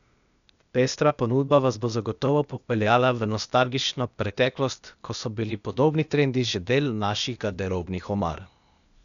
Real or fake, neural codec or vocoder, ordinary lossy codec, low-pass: fake; codec, 16 kHz, 0.8 kbps, ZipCodec; none; 7.2 kHz